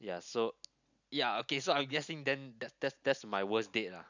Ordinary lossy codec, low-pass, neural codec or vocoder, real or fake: none; 7.2 kHz; none; real